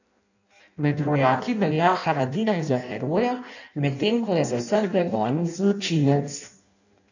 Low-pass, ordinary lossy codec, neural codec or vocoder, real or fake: 7.2 kHz; none; codec, 16 kHz in and 24 kHz out, 0.6 kbps, FireRedTTS-2 codec; fake